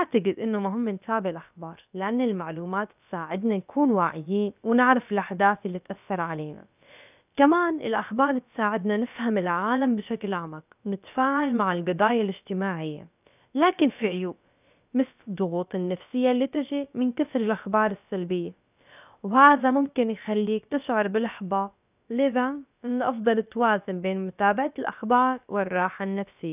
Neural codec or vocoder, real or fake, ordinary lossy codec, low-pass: codec, 16 kHz, about 1 kbps, DyCAST, with the encoder's durations; fake; none; 3.6 kHz